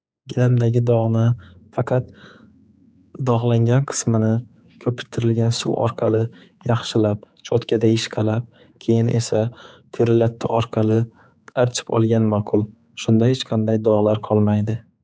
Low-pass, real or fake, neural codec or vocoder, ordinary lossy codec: none; fake; codec, 16 kHz, 4 kbps, X-Codec, HuBERT features, trained on general audio; none